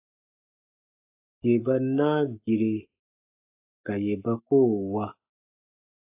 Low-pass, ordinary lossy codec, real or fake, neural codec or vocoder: 3.6 kHz; AAC, 32 kbps; fake; codec, 44.1 kHz, 7.8 kbps, Pupu-Codec